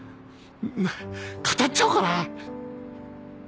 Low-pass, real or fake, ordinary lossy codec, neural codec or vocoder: none; real; none; none